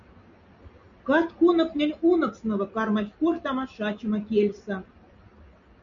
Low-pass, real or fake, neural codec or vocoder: 7.2 kHz; real; none